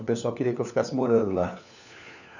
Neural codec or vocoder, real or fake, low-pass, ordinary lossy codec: codec, 16 kHz, 4 kbps, FunCodec, trained on LibriTTS, 50 frames a second; fake; 7.2 kHz; none